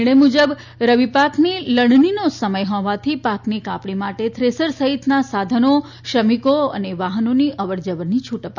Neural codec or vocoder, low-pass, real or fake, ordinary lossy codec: none; 7.2 kHz; real; none